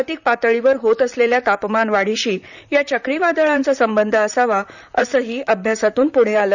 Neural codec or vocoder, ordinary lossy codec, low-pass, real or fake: vocoder, 44.1 kHz, 128 mel bands, Pupu-Vocoder; none; 7.2 kHz; fake